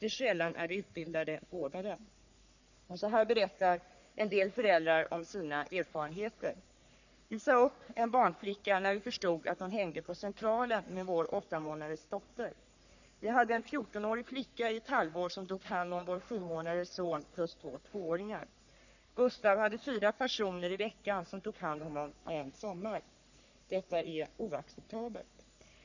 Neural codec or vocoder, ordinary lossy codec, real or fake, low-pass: codec, 44.1 kHz, 3.4 kbps, Pupu-Codec; none; fake; 7.2 kHz